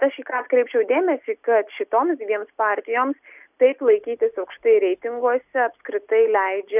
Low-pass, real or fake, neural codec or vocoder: 3.6 kHz; real; none